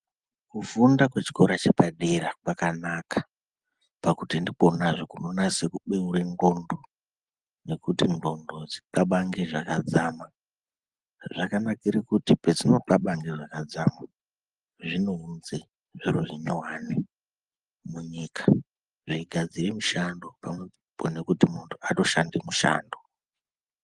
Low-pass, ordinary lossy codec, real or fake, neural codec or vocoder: 10.8 kHz; Opus, 24 kbps; real; none